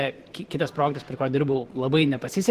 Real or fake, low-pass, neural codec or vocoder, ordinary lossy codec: fake; 14.4 kHz; vocoder, 44.1 kHz, 128 mel bands every 512 samples, BigVGAN v2; Opus, 16 kbps